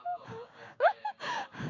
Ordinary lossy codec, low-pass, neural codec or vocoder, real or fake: MP3, 48 kbps; 7.2 kHz; codec, 16 kHz, 6 kbps, DAC; fake